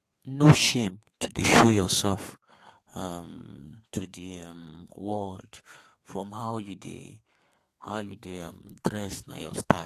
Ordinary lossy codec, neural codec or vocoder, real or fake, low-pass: AAC, 64 kbps; codec, 32 kHz, 1.9 kbps, SNAC; fake; 14.4 kHz